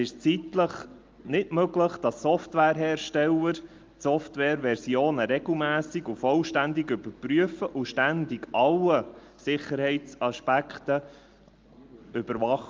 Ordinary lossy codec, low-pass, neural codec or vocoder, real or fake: Opus, 32 kbps; 7.2 kHz; none; real